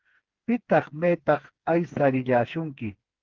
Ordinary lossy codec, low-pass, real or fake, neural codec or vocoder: Opus, 16 kbps; 7.2 kHz; fake; codec, 16 kHz, 4 kbps, FreqCodec, smaller model